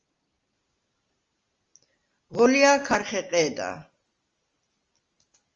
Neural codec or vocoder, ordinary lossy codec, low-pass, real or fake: none; Opus, 32 kbps; 7.2 kHz; real